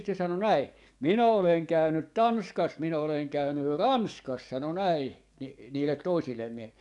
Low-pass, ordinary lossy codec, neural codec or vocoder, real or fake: 10.8 kHz; none; codec, 44.1 kHz, 7.8 kbps, DAC; fake